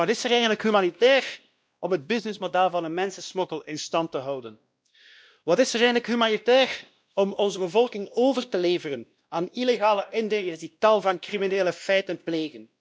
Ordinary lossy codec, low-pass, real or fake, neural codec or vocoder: none; none; fake; codec, 16 kHz, 1 kbps, X-Codec, WavLM features, trained on Multilingual LibriSpeech